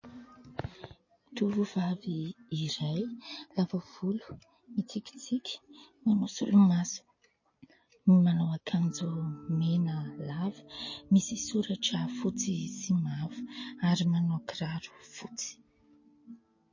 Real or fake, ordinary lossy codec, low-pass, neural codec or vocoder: real; MP3, 32 kbps; 7.2 kHz; none